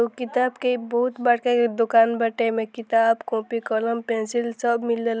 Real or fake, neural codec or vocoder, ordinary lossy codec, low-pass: real; none; none; none